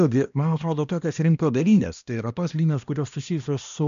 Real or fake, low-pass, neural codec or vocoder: fake; 7.2 kHz; codec, 16 kHz, 1 kbps, X-Codec, HuBERT features, trained on balanced general audio